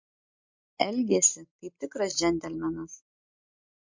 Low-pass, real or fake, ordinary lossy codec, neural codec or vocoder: 7.2 kHz; real; MP3, 48 kbps; none